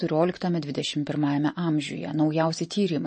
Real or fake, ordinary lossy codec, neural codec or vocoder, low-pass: real; MP3, 32 kbps; none; 10.8 kHz